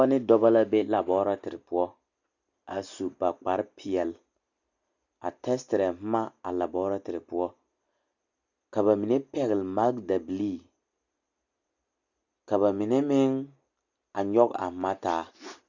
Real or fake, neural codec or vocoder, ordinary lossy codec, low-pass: real; none; Opus, 64 kbps; 7.2 kHz